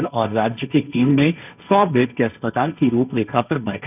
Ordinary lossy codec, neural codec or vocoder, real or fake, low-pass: none; codec, 16 kHz, 1.1 kbps, Voila-Tokenizer; fake; 3.6 kHz